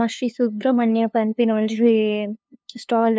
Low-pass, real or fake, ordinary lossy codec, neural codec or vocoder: none; fake; none; codec, 16 kHz, 2 kbps, FunCodec, trained on LibriTTS, 25 frames a second